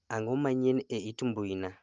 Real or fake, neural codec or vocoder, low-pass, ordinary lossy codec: real; none; 7.2 kHz; Opus, 24 kbps